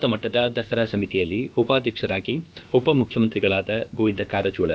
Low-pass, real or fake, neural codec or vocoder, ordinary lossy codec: none; fake; codec, 16 kHz, about 1 kbps, DyCAST, with the encoder's durations; none